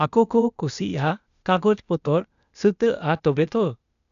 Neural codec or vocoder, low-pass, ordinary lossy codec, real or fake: codec, 16 kHz, 0.8 kbps, ZipCodec; 7.2 kHz; none; fake